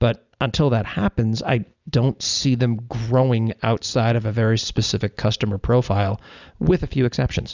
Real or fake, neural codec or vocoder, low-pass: real; none; 7.2 kHz